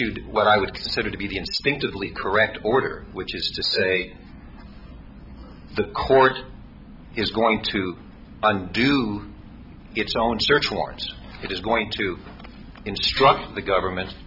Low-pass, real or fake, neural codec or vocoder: 5.4 kHz; real; none